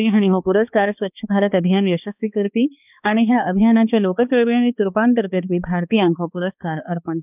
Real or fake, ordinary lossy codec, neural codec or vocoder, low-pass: fake; none; codec, 16 kHz, 2 kbps, X-Codec, HuBERT features, trained on balanced general audio; 3.6 kHz